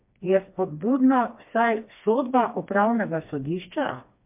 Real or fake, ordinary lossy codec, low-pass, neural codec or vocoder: fake; MP3, 32 kbps; 3.6 kHz; codec, 16 kHz, 2 kbps, FreqCodec, smaller model